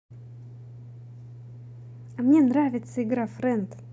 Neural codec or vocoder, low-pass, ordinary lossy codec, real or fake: none; none; none; real